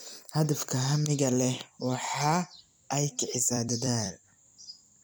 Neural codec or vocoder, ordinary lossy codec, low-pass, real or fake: vocoder, 44.1 kHz, 128 mel bands every 256 samples, BigVGAN v2; none; none; fake